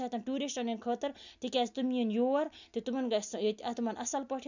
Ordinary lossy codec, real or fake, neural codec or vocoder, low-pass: none; real; none; 7.2 kHz